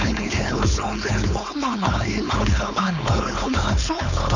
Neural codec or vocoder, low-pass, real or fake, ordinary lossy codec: codec, 16 kHz, 4.8 kbps, FACodec; 7.2 kHz; fake; none